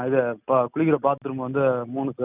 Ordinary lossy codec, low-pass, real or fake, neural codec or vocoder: none; 3.6 kHz; real; none